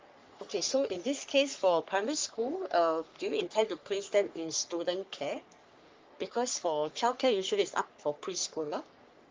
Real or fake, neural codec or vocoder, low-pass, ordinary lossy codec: fake; codec, 44.1 kHz, 3.4 kbps, Pupu-Codec; 7.2 kHz; Opus, 32 kbps